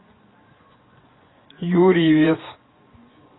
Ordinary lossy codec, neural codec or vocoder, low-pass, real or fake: AAC, 16 kbps; vocoder, 24 kHz, 100 mel bands, Vocos; 7.2 kHz; fake